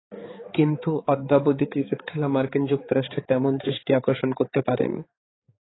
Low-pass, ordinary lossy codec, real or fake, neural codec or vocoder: 7.2 kHz; AAC, 16 kbps; fake; codec, 16 kHz, 4 kbps, X-Codec, HuBERT features, trained on balanced general audio